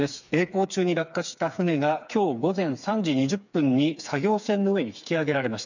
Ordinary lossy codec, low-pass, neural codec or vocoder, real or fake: none; 7.2 kHz; codec, 16 kHz, 4 kbps, FreqCodec, smaller model; fake